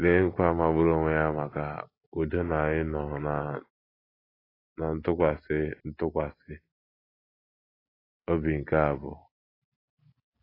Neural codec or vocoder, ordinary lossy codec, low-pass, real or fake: none; AAC, 24 kbps; 5.4 kHz; real